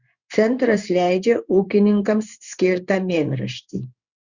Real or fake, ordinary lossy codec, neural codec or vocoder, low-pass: fake; Opus, 64 kbps; codec, 16 kHz in and 24 kHz out, 1 kbps, XY-Tokenizer; 7.2 kHz